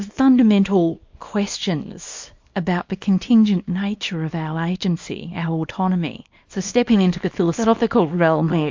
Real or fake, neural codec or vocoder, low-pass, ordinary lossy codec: fake; codec, 24 kHz, 0.9 kbps, WavTokenizer, small release; 7.2 kHz; MP3, 48 kbps